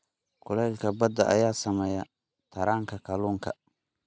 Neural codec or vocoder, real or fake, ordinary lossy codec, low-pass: none; real; none; none